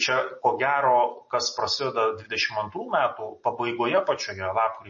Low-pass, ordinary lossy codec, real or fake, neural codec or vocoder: 7.2 kHz; MP3, 32 kbps; real; none